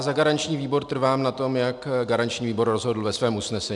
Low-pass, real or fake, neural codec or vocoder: 10.8 kHz; real; none